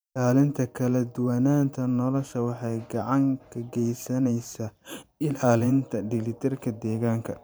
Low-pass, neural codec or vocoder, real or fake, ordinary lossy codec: none; vocoder, 44.1 kHz, 128 mel bands every 512 samples, BigVGAN v2; fake; none